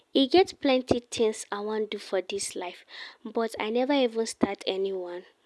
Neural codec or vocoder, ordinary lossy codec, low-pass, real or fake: none; none; none; real